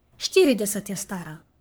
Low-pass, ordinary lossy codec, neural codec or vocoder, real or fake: none; none; codec, 44.1 kHz, 3.4 kbps, Pupu-Codec; fake